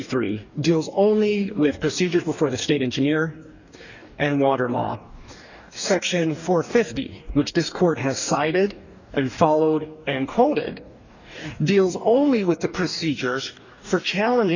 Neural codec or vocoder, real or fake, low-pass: codec, 44.1 kHz, 2.6 kbps, DAC; fake; 7.2 kHz